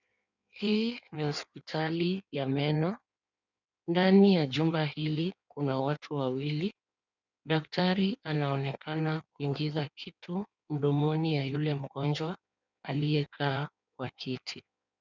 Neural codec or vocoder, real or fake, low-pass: codec, 16 kHz in and 24 kHz out, 1.1 kbps, FireRedTTS-2 codec; fake; 7.2 kHz